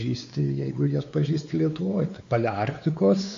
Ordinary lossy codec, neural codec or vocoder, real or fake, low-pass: AAC, 48 kbps; codec, 16 kHz, 4 kbps, X-Codec, WavLM features, trained on Multilingual LibriSpeech; fake; 7.2 kHz